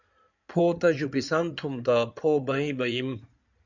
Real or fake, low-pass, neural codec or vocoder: fake; 7.2 kHz; codec, 16 kHz in and 24 kHz out, 2.2 kbps, FireRedTTS-2 codec